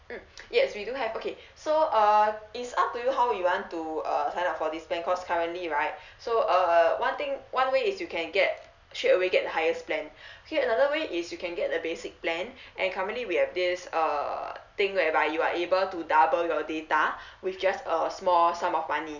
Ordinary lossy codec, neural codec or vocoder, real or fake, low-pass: none; none; real; 7.2 kHz